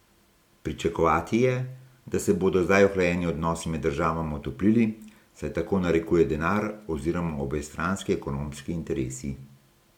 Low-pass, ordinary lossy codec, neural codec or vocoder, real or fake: 19.8 kHz; MP3, 96 kbps; none; real